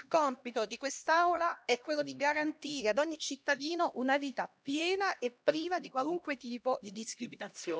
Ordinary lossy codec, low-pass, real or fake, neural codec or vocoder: none; none; fake; codec, 16 kHz, 1 kbps, X-Codec, HuBERT features, trained on LibriSpeech